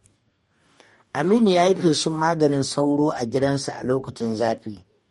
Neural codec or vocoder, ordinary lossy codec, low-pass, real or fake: codec, 44.1 kHz, 2.6 kbps, DAC; MP3, 48 kbps; 19.8 kHz; fake